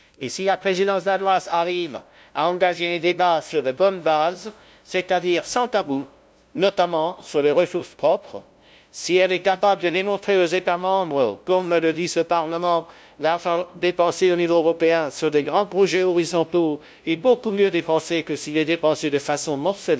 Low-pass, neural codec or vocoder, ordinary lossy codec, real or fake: none; codec, 16 kHz, 0.5 kbps, FunCodec, trained on LibriTTS, 25 frames a second; none; fake